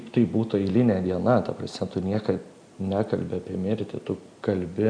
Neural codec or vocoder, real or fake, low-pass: none; real; 9.9 kHz